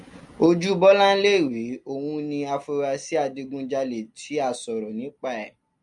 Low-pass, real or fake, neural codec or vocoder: 10.8 kHz; real; none